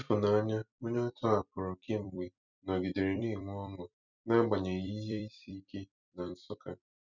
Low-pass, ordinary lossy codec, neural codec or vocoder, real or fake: 7.2 kHz; none; vocoder, 44.1 kHz, 128 mel bands every 256 samples, BigVGAN v2; fake